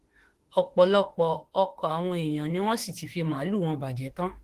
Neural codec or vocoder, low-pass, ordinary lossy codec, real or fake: autoencoder, 48 kHz, 32 numbers a frame, DAC-VAE, trained on Japanese speech; 14.4 kHz; Opus, 16 kbps; fake